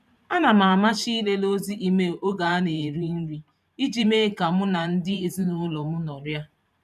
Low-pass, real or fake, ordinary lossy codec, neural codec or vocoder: 14.4 kHz; fake; none; vocoder, 44.1 kHz, 128 mel bands every 512 samples, BigVGAN v2